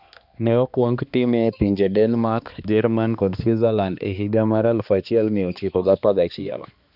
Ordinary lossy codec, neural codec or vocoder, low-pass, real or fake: none; codec, 16 kHz, 2 kbps, X-Codec, HuBERT features, trained on balanced general audio; 5.4 kHz; fake